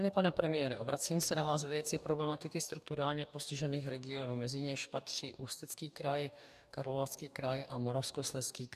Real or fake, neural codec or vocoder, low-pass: fake; codec, 44.1 kHz, 2.6 kbps, DAC; 14.4 kHz